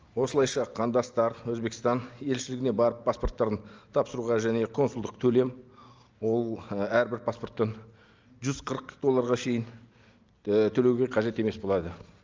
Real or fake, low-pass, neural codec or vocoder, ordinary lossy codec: real; 7.2 kHz; none; Opus, 24 kbps